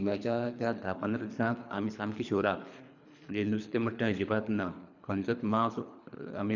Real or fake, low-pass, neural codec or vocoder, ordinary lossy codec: fake; 7.2 kHz; codec, 24 kHz, 3 kbps, HILCodec; none